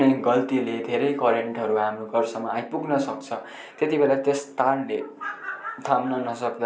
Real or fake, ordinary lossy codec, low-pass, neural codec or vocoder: real; none; none; none